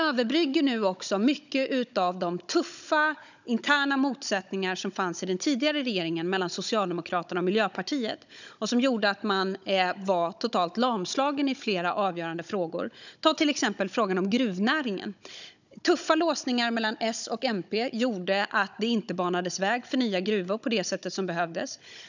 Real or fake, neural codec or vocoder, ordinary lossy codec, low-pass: fake; codec, 16 kHz, 16 kbps, FunCodec, trained on Chinese and English, 50 frames a second; none; 7.2 kHz